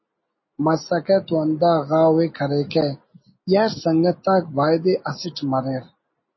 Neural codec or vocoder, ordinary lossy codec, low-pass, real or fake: none; MP3, 24 kbps; 7.2 kHz; real